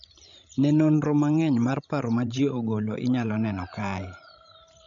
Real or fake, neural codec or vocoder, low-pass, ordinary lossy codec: fake; codec, 16 kHz, 16 kbps, FreqCodec, larger model; 7.2 kHz; MP3, 64 kbps